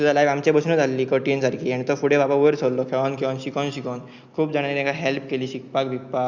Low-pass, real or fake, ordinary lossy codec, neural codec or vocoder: 7.2 kHz; real; Opus, 64 kbps; none